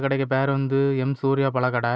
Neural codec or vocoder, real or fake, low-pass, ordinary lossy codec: none; real; none; none